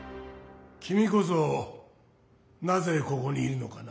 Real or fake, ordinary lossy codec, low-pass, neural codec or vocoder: real; none; none; none